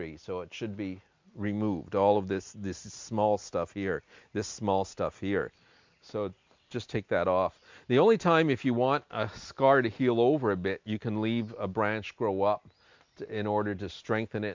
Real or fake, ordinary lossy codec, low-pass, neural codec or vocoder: real; MP3, 64 kbps; 7.2 kHz; none